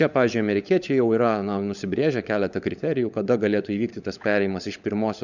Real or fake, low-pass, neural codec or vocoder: fake; 7.2 kHz; codec, 16 kHz, 16 kbps, FunCodec, trained on LibriTTS, 50 frames a second